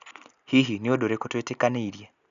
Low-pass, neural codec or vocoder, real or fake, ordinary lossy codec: 7.2 kHz; none; real; none